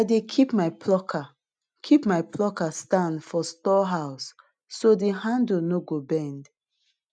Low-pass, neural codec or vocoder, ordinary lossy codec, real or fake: 9.9 kHz; none; none; real